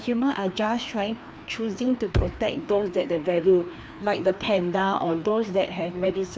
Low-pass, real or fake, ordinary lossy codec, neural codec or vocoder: none; fake; none; codec, 16 kHz, 2 kbps, FreqCodec, larger model